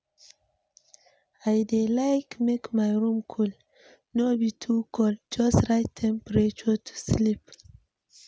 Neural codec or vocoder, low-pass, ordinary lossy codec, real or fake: none; none; none; real